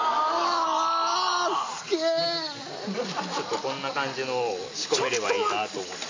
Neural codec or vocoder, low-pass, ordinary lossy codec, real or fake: none; 7.2 kHz; AAC, 32 kbps; real